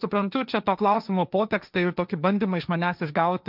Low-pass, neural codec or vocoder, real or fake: 5.4 kHz; codec, 16 kHz, 1.1 kbps, Voila-Tokenizer; fake